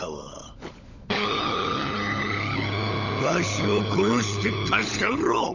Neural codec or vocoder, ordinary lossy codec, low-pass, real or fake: codec, 16 kHz, 16 kbps, FunCodec, trained on LibriTTS, 50 frames a second; none; 7.2 kHz; fake